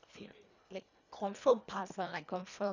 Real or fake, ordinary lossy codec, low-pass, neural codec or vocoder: fake; none; 7.2 kHz; codec, 24 kHz, 1.5 kbps, HILCodec